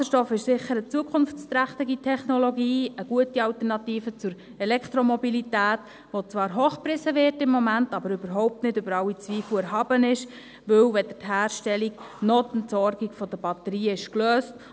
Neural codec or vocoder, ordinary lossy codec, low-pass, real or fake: none; none; none; real